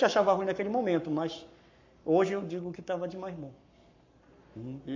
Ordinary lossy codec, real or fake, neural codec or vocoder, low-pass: MP3, 48 kbps; fake; codec, 44.1 kHz, 7.8 kbps, Pupu-Codec; 7.2 kHz